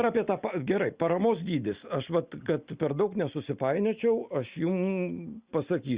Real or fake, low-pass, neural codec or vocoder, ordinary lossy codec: real; 3.6 kHz; none; Opus, 64 kbps